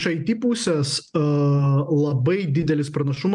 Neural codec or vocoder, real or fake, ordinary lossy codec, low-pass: none; real; MP3, 96 kbps; 10.8 kHz